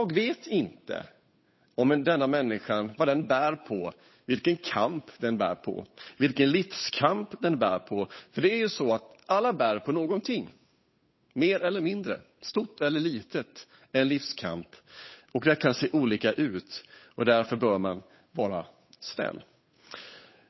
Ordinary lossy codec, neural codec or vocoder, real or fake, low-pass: MP3, 24 kbps; codec, 16 kHz, 8 kbps, FunCodec, trained on Chinese and English, 25 frames a second; fake; 7.2 kHz